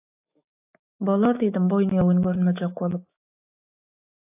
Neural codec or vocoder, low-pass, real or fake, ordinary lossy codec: autoencoder, 48 kHz, 128 numbers a frame, DAC-VAE, trained on Japanese speech; 3.6 kHz; fake; AAC, 32 kbps